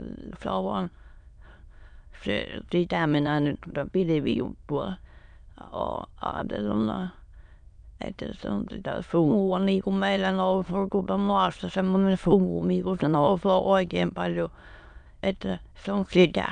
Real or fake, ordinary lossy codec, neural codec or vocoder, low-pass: fake; none; autoencoder, 22.05 kHz, a latent of 192 numbers a frame, VITS, trained on many speakers; 9.9 kHz